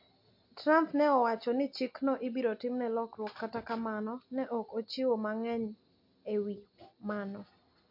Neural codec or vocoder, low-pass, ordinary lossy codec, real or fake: none; 5.4 kHz; MP3, 32 kbps; real